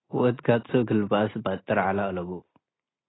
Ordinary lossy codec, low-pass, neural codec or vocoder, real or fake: AAC, 16 kbps; 7.2 kHz; none; real